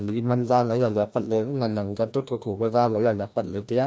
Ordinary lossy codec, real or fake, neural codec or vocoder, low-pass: none; fake; codec, 16 kHz, 1 kbps, FreqCodec, larger model; none